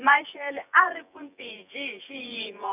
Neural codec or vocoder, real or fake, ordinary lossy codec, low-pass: vocoder, 24 kHz, 100 mel bands, Vocos; fake; none; 3.6 kHz